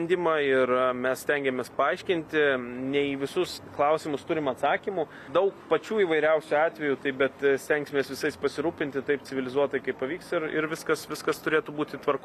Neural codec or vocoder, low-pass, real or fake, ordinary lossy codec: none; 14.4 kHz; real; AAC, 96 kbps